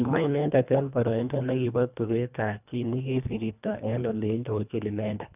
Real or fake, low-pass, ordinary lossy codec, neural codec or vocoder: fake; 3.6 kHz; none; codec, 24 kHz, 1.5 kbps, HILCodec